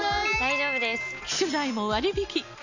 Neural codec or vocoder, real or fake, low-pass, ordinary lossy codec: none; real; 7.2 kHz; none